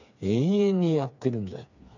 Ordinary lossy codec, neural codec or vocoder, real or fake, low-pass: none; codec, 16 kHz, 4 kbps, FreqCodec, smaller model; fake; 7.2 kHz